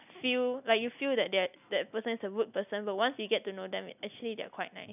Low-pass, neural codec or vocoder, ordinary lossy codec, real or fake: 3.6 kHz; none; none; real